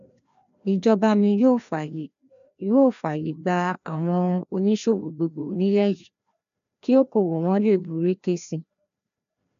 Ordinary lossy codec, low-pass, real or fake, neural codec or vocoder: none; 7.2 kHz; fake; codec, 16 kHz, 1 kbps, FreqCodec, larger model